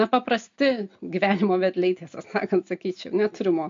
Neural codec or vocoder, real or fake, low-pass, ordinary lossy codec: none; real; 7.2 kHz; MP3, 48 kbps